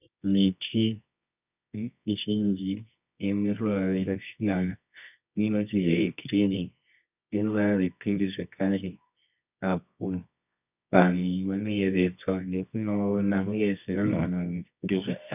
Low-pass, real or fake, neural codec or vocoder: 3.6 kHz; fake; codec, 24 kHz, 0.9 kbps, WavTokenizer, medium music audio release